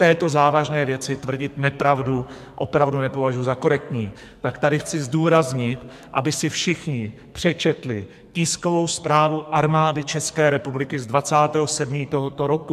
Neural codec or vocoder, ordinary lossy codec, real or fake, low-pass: codec, 44.1 kHz, 2.6 kbps, SNAC; MP3, 96 kbps; fake; 14.4 kHz